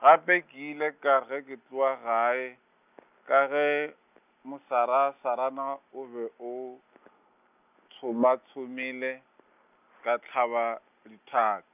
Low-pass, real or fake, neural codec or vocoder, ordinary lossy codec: 3.6 kHz; real; none; none